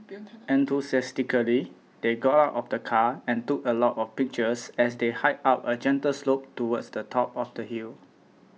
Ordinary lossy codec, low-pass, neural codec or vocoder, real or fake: none; none; none; real